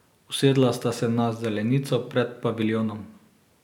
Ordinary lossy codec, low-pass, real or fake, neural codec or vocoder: none; 19.8 kHz; real; none